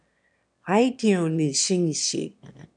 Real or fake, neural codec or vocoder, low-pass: fake; autoencoder, 22.05 kHz, a latent of 192 numbers a frame, VITS, trained on one speaker; 9.9 kHz